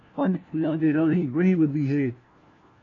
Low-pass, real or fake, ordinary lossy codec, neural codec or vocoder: 7.2 kHz; fake; AAC, 32 kbps; codec, 16 kHz, 1 kbps, FunCodec, trained on LibriTTS, 50 frames a second